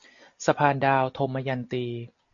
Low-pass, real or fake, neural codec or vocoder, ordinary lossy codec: 7.2 kHz; real; none; AAC, 48 kbps